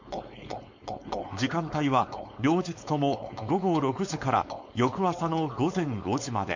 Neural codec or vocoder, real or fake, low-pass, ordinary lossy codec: codec, 16 kHz, 4.8 kbps, FACodec; fake; 7.2 kHz; MP3, 48 kbps